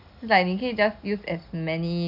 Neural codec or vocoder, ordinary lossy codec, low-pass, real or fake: none; none; 5.4 kHz; real